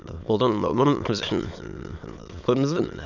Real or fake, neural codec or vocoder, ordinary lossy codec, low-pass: fake; autoencoder, 22.05 kHz, a latent of 192 numbers a frame, VITS, trained on many speakers; none; 7.2 kHz